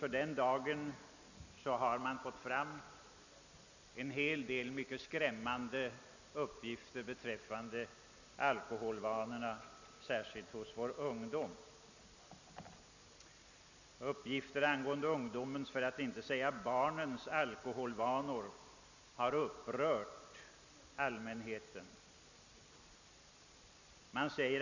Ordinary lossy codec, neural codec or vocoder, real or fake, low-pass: none; none; real; 7.2 kHz